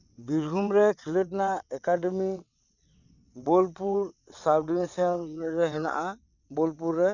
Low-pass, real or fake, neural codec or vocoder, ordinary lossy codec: 7.2 kHz; fake; vocoder, 22.05 kHz, 80 mel bands, WaveNeXt; none